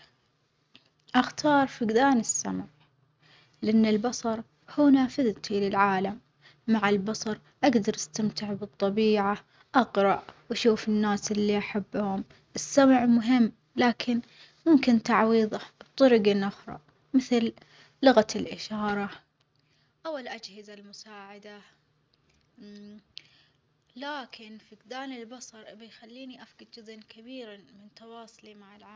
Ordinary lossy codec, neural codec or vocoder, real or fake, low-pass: none; none; real; none